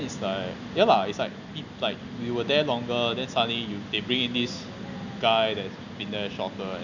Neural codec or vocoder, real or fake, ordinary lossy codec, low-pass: none; real; none; 7.2 kHz